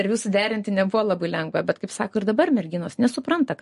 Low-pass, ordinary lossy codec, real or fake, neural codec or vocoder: 14.4 kHz; MP3, 48 kbps; real; none